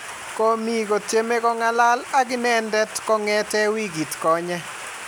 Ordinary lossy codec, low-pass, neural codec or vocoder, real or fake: none; none; none; real